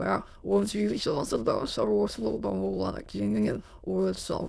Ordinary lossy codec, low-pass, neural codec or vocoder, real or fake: none; none; autoencoder, 22.05 kHz, a latent of 192 numbers a frame, VITS, trained on many speakers; fake